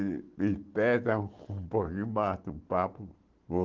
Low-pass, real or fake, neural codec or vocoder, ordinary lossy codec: 7.2 kHz; real; none; Opus, 16 kbps